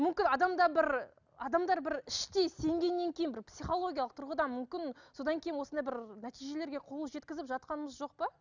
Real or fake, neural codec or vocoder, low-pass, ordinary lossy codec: real; none; 7.2 kHz; none